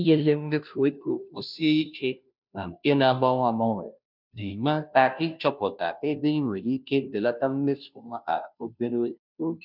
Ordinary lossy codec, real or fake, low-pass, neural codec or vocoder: none; fake; 5.4 kHz; codec, 16 kHz, 0.5 kbps, FunCodec, trained on Chinese and English, 25 frames a second